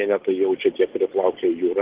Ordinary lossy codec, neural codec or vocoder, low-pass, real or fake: Opus, 16 kbps; none; 3.6 kHz; real